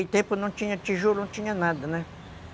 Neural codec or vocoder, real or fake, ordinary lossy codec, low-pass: none; real; none; none